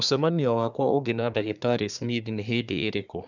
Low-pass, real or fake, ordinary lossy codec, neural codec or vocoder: 7.2 kHz; fake; none; codec, 24 kHz, 1 kbps, SNAC